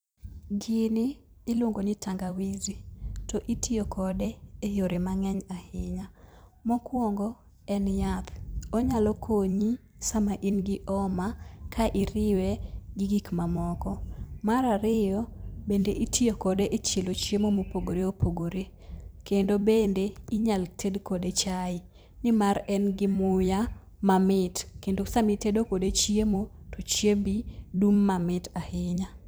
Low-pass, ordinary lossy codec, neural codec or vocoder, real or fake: none; none; vocoder, 44.1 kHz, 128 mel bands every 256 samples, BigVGAN v2; fake